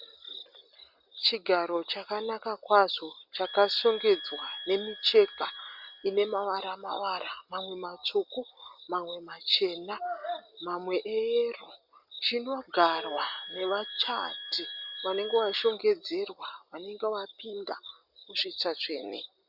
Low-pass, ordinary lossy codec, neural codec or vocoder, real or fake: 5.4 kHz; Opus, 64 kbps; none; real